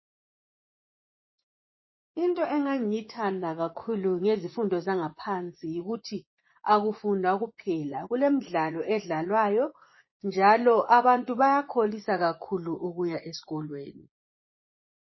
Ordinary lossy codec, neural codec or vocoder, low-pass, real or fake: MP3, 24 kbps; vocoder, 24 kHz, 100 mel bands, Vocos; 7.2 kHz; fake